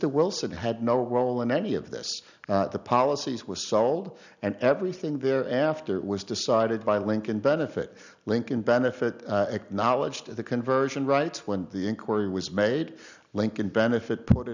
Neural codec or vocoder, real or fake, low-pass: none; real; 7.2 kHz